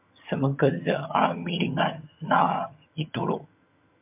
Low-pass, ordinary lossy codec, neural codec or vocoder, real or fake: 3.6 kHz; MP3, 32 kbps; vocoder, 22.05 kHz, 80 mel bands, HiFi-GAN; fake